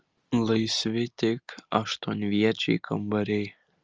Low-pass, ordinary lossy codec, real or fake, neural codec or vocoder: 7.2 kHz; Opus, 24 kbps; real; none